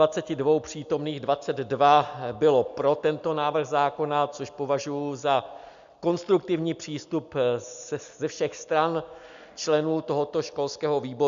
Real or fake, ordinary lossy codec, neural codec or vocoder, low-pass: real; MP3, 64 kbps; none; 7.2 kHz